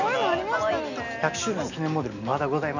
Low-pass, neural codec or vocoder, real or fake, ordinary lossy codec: 7.2 kHz; none; real; none